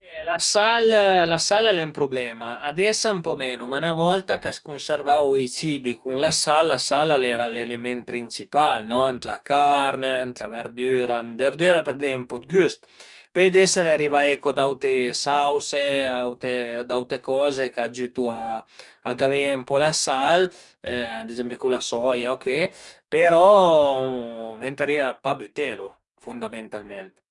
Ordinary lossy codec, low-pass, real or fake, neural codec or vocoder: none; 10.8 kHz; fake; codec, 44.1 kHz, 2.6 kbps, DAC